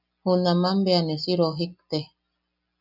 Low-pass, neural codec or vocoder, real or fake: 5.4 kHz; none; real